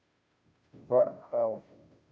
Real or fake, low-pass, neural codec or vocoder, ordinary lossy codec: fake; none; codec, 16 kHz, 0.5 kbps, FunCodec, trained on Chinese and English, 25 frames a second; none